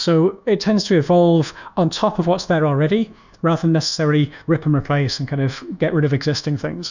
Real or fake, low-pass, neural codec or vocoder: fake; 7.2 kHz; codec, 24 kHz, 1.2 kbps, DualCodec